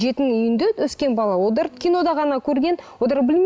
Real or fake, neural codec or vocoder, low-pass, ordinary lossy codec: real; none; none; none